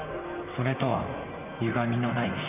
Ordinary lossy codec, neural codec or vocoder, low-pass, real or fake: none; vocoder, 44.1 kHz, 128 mel bands, Pupu-Vocoder; 3.6 kHz; fake